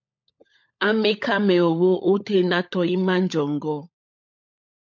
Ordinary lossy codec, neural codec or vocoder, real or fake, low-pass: MP3, 64 kbps; codec, 16 kHz, 16 kbps, FunCodec, trained on LibriTTS, 50 frames a second; fake; 7.2 kHz